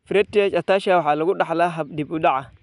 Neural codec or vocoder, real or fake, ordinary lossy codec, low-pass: none; real; none; 10.8 kHz